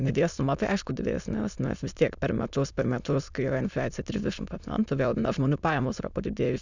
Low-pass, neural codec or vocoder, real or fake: 7.2 kHz; autoencoder, 22.05 kHz, a latent of 192 numbers a frame, VITS, trained on many speakers; fake